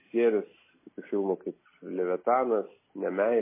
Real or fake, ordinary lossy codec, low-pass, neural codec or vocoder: real; MP3, 16 kbps; 3.6 kHz; none